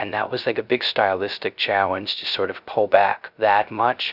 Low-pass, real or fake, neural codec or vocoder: 5.4 kHz; fake; codec, 16 kHz, 0.3 kbps, FocalCodec